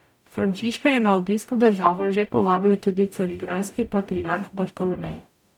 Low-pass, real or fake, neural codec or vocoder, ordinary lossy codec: 19.8 kHz; fake; codec, 44.1 kHz, 0.9 kbps, DAC; none